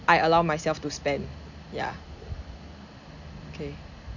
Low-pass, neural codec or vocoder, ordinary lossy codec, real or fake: 7.2 kHz; none; none; real